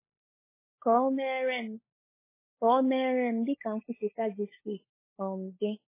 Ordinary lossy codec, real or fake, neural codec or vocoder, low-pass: MP3, 16 kbps; fake; codec, 16 kHz, 16 kbps, FunCodec, trained on LibriTTS, 50 frames a second; 3.6 kHz